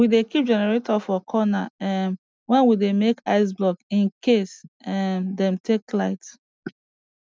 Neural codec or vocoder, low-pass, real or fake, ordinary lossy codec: none; none; real; none